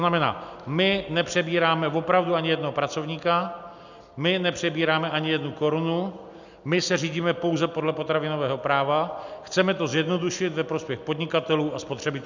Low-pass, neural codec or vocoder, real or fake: 7.2 kHz; none; real